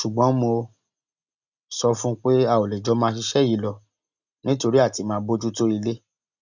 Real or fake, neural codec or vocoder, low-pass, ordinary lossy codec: real; none; 7.2 kHz; none